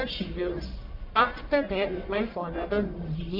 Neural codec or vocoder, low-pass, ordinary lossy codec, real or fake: codec, 44.1 kHz, 1.7 kbps, Pupu-Codec; 5.4 kHz; MP3, 48 kbps; fake